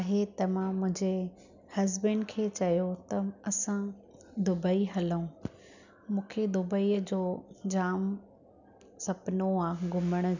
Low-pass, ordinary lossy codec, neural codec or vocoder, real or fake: 7.2 kHz; none; none; real